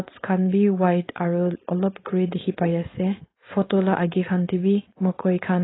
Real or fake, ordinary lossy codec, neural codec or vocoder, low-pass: fake; AAC, 16 kbps; codec, 16 kHz, 4.8 kbps, FACodec; 7.2 kHz